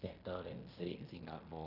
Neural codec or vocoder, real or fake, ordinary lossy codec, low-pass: codec, 16 kHz in and 24 kHz out, 0.9 kbps, LongCat-Audio-Codec, fine tuned four codebook decoder; fake; none; 5.4 kHz